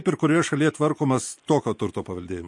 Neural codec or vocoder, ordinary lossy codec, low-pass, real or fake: none; MP3, 48 kbps; 10.8 kHz; real